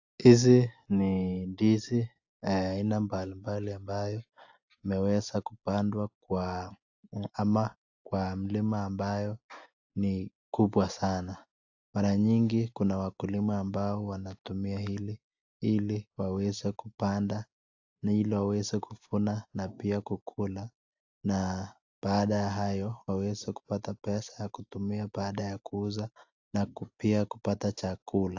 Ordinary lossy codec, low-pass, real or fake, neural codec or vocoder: AAC, 48 kbps; 7.2 kHz; real; none